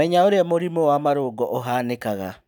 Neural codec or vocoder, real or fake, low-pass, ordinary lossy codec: none; real; 19.8 kHz; none